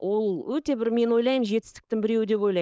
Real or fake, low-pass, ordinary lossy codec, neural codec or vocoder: fake; none; none; codec, 16 kHz, 4.8 kbps, FACodec